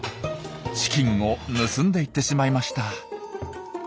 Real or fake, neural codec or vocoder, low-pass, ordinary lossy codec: real; none; none; none